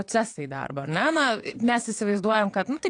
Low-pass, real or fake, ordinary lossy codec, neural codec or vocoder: 9.9 kHz; fake; AAC, 48 kbps; vocoder, 22.05 kHz, 80 mel bands, WaveNeXt